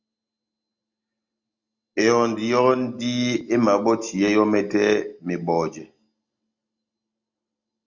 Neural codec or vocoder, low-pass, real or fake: none; 7.2 kHz; real